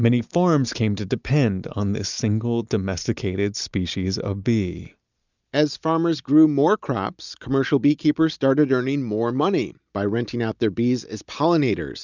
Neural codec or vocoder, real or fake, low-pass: none; real; 7.2 kHz